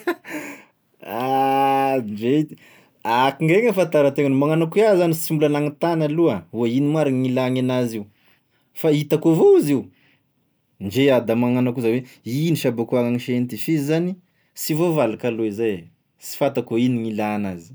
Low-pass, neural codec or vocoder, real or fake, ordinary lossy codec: none; none; real; none